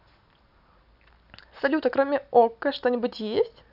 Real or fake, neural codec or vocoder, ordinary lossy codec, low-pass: real; none; none; 5.4 kHz